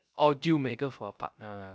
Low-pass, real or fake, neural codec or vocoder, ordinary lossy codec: none; fake; codec, 16 kHz, 0.3 kbps, FocalCodec; none